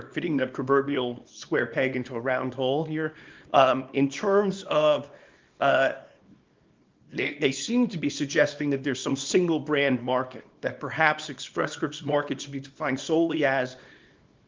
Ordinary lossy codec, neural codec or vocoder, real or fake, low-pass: Opus, 24 kbps; codec, 24 kHz, 0.9 kbps, WavTokenizer, small release; fake; 7.2 kHz